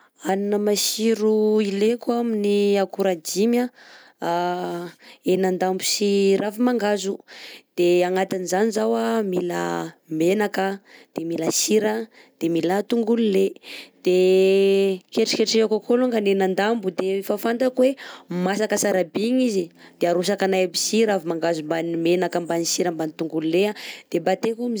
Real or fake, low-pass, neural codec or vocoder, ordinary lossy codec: real; none; none; none